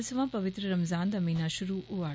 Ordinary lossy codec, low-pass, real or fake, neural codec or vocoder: none; none; real; none